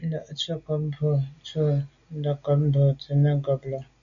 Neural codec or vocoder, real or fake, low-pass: none; real; 7.2 kHz